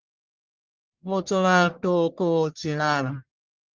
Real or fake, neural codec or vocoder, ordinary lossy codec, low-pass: fake; codec, 44.1 kHz, 1.7 kbps, Pupu-Codec; Opus, 16 kbps; 7.2 kHz